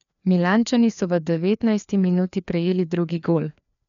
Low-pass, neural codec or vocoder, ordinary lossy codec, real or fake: 7.2 kHz; codec, 16 kHz, 2 kbps, FreqCodec, larger model; none; fake